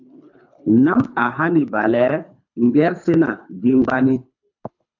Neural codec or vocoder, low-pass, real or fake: codec, 24 kHz, 3 kbps, HILCodec; 7.2 kHz; fake